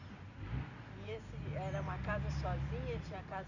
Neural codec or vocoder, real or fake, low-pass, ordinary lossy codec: none; real; 7.2 kHz; none